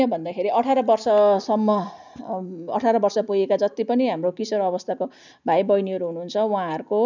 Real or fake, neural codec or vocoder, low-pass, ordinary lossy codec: real; none; 7.2 kHz; none